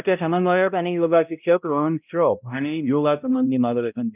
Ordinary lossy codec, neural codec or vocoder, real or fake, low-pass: none; codec, 16 kHz, 0.5 kbps, X-Codec, HuBERT features, trained on balanced general audio; fake; 3.6 kHz